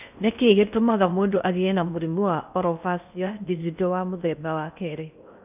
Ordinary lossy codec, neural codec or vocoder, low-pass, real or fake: none; codec, 16 kHz in and 24 kHz out, 0.6 kbps, FocalCodec, streaming, 4096 codes; 3.6 kHz; fake